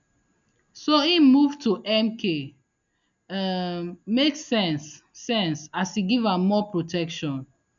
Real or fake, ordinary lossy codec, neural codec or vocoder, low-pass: real; none; none; 7.2 kHz